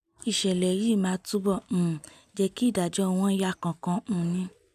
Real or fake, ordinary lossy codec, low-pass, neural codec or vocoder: real; none; 14.4 kHz; none